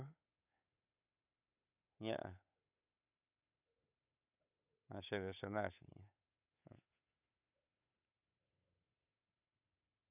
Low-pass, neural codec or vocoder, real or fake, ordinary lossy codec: 3.6 kHz; codec, 16 kHz, 8 kbps, FunCodec, trained on Chinese and English, 25 frames a second; fake; none